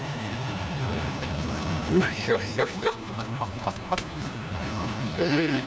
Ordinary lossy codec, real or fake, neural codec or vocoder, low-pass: none; fake; codec, 16 kHz, 1 kbps, FunCodec, trained on LibriTTS, 50 frames a second; none